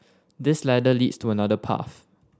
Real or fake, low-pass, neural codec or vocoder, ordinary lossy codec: real; none; none; none